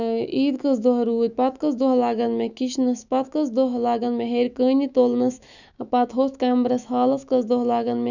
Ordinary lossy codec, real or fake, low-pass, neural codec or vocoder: none; fake; 7.2 kHz; autoencoder, 48 kHz, 128 numbers a frame, DAC-VAE, trained on Japanese speech